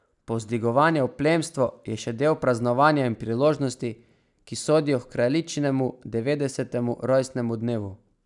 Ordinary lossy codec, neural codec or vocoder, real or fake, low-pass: none; none; real; 10.8 kHz